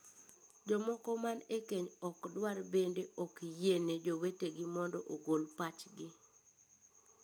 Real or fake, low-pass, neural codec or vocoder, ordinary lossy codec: real; none; none; none